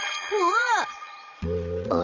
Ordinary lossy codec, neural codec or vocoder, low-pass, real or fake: none; vocoder, 22.05 kHz, 80 mel bands, Vocos; 7.2 kHz; fake